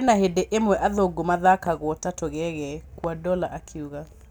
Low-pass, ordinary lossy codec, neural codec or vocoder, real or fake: none; none; none; real